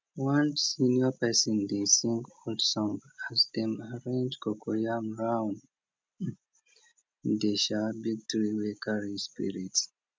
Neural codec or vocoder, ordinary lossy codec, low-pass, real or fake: none; none; none; real